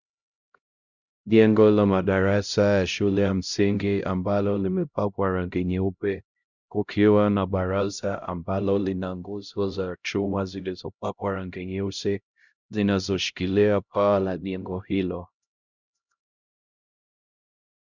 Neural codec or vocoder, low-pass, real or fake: codec, 16 kHz, 0.5 kbps, X-Codec, HuBERT features, trained on LibriSpeech; 7.2 kHz; fake